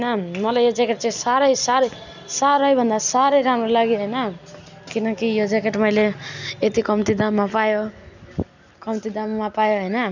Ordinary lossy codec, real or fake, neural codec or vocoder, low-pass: none; real; none; 7.2 kHz